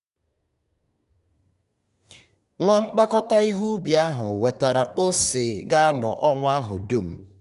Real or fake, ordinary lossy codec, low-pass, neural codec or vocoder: fake; none; 10.8 kHz; codec, 24 kHz, 1 kbps, SNAC